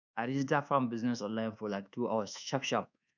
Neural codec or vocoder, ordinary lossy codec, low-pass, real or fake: codec, 24 kHz, 1.2 kbps, DualCodec; none; 7.2 kHz; fake